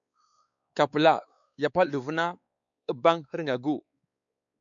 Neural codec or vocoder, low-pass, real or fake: codec, 16 kHz, 4 kbps, X-Codec, WavLM features, trained on Multilingual LibriSpeech; 7.2 kHz; fake